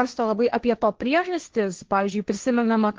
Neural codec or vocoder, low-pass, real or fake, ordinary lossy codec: codec, 16 kHz, 1.1 kbps, Voila-Tokenizer; 7.2 kHz; fake; Opus, 16 kbps